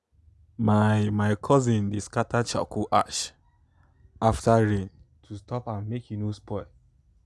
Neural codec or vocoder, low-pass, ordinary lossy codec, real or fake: none; none; none; real